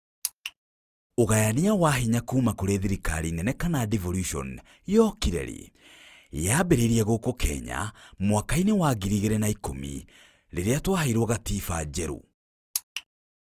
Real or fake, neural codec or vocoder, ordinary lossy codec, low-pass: real; none; Opus, 32 kbps; 14.4 kHz